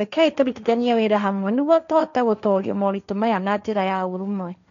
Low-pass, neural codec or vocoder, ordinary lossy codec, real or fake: 7.2 kHz; codec, 16 kHz, 1.1 kbps, Voila-Tokenizer; none; fake